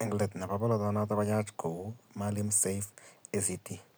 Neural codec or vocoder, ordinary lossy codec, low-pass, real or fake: none; none; none; real